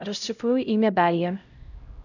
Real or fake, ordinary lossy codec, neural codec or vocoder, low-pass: fake; none; codec, 16 kHz, 0.5 kbps, X-Codec, HuBERT features, trained on LibriSpeech; 7.2 kHz